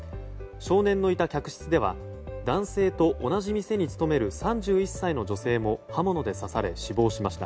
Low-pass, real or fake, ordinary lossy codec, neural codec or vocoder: none; real; none; none